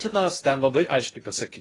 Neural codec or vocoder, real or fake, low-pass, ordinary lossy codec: codec, 16 kHz in and 24 kHz out, 0.6 kbps, FocalCodec, streaming, 2048 codes; fake; 10.8 kHz; AAC, 32 kbps